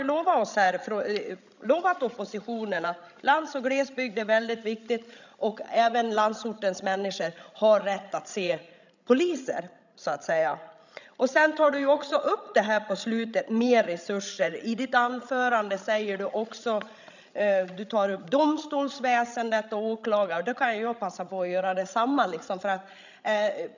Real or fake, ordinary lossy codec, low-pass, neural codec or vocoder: fake; none; 7.2 kHz; codec, 16 kHz, 16 kbps, FreqCodec, larger model